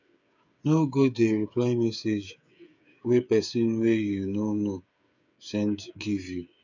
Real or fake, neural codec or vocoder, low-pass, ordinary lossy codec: fake; codec, 16 kHz, 8 kbps, FreqCodec, smaller model; 7.2 kHz; none